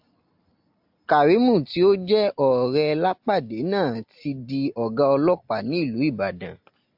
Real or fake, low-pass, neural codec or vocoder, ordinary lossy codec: real; 5.4 kHz; none; AAC, 48 kbps